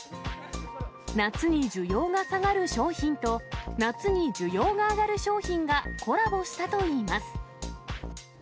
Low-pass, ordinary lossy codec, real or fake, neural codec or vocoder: none; none; real; none